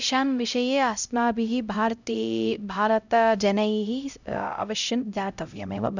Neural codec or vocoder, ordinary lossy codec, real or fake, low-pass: codec, 16 kHz, 0.5 kbps, X-Codec, HuBERT features, trained on LibriSpeech; none; fake; 7.2 kHz